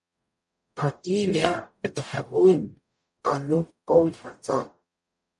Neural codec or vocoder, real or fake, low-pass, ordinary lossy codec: codec, 44.1 kHz, 0.9 kbps, DAC; fake; 10.8 kHz; MP3, 64 kbps